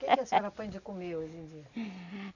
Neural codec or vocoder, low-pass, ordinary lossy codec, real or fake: none; 7.2 kHz; none; real